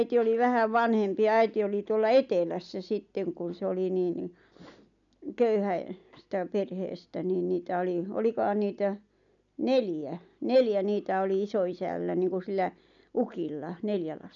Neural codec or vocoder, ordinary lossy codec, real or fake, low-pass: none; none; real; 7.2 kHz